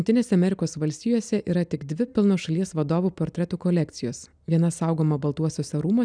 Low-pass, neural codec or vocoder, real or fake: 9.9 kHz; none; real